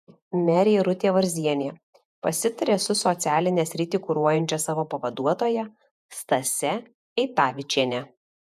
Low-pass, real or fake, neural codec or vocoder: 14.4 kHz; fake; vocoder, 48 kHz, 128 mel bands, Vocos